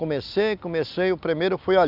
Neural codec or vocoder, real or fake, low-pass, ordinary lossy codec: none; real; 5.4 kHz; none